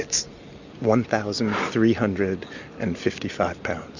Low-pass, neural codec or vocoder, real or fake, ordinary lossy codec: 7.2 kHz; none; real; Opus, 64 kbps